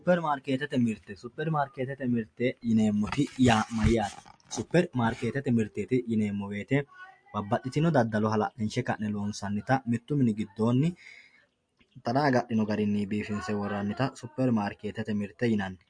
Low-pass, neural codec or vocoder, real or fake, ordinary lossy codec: 9.9 kHz; none; real; MP3, 48 kbps